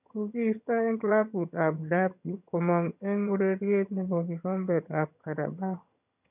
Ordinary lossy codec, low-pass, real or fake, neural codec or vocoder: none; 3.6 kHz; fake; vocoder, 22.05 kHz, 80 mel bands, HiFi-GAN